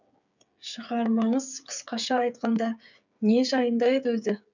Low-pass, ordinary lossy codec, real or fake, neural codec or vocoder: 7.2 kHz; none; fake; codec, 16 kHz, 8 kbps, FreqCodec, smaller model